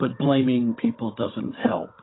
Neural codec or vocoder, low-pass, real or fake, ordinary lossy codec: codec, 16 kHz, 16 kbps, FunCodec, trained on Chinese and English, 50 frames a second; 7.2 kHz; fake; AAC, 16 kbps